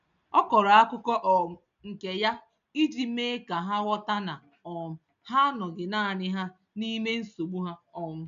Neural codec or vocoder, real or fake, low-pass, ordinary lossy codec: none; real; 7.2 kHz; none